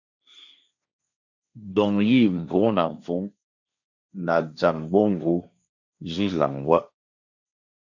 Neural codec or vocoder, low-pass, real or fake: codec, 16 kHz, 1.1 kbps, Voila-Tokenizer; 7.2 kHz; fake